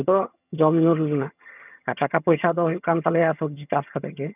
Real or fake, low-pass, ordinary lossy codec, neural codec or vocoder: fake; 3.6 kHz; none; vocoder, 22.05 kHz, 80 mel bands, HiFi-GAN